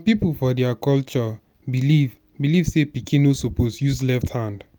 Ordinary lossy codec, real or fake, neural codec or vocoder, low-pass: none; real; none; none